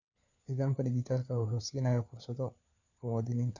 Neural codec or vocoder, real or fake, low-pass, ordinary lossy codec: codec, 16 kHz, 4 kbps, FunCodec, trained on LibriTTS, 50 frames a second; fake; 7.2 kHz; none